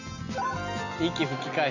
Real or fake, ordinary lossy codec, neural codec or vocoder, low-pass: real; none; none; 7.2 kHz